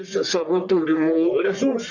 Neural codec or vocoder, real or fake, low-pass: codec, 44.1 kHz, 1.7 kbps, Pupu-Codec; fake; 7.2 kHz